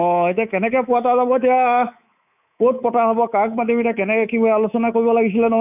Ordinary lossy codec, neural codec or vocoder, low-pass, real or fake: none; codec, 24 kHz, 3.1 kbps, DualCodec; 3.6 kHz; fake